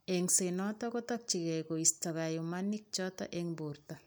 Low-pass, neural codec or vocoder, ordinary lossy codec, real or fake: none; none; none; real